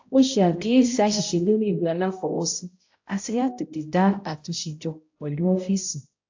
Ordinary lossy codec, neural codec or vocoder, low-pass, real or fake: AAC, 48 kbps; codec, 16 kHz, 0.5 kbps, X-Codec, HuBERT features, trained on balanced general audio; 7.2 kHz; fake